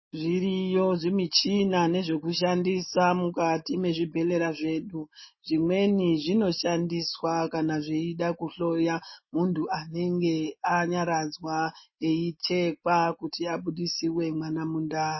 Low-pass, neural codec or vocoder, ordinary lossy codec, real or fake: 7.2 kHz; none; MP3, 24 kbps; real